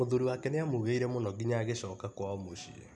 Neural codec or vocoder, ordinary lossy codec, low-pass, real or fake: none; none; none; real